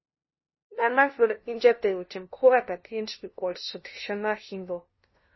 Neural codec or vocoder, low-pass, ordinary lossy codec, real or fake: codec, 16 kHz, 0.5 kbps, FunCodec, trained on LibriTTS, 25 frames a second; 7.2 kHz; MP3, 24 kbps; fake